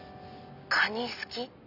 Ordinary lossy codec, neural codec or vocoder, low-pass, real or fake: none; none; 5.4 kHz; real